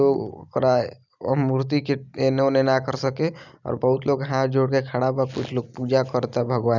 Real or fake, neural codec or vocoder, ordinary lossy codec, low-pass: real; none; none; 7.2 kHz